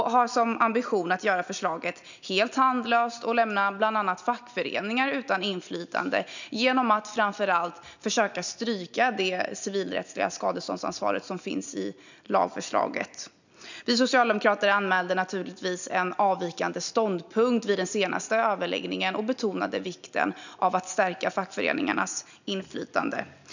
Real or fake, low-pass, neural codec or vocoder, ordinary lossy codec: real; 7.2 kHz; none; none